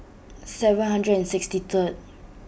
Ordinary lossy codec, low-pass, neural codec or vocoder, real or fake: none; none; none; real